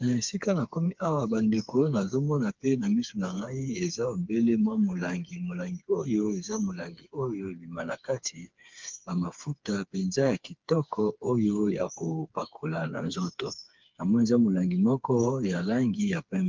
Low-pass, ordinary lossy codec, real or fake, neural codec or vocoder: 7.2 kHz; Opus, 32 kbps; fake; codec, 16 kHz, 4 kbps, FreqCodec, smaller model